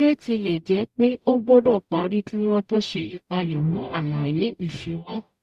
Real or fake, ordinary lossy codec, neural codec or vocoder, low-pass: fake; Opus, 64 kbps; codec, 44.1 kHz, 0.9 kbps, DAC; 14.4 kHz